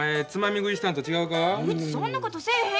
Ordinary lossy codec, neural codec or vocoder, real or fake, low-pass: none; none; real; none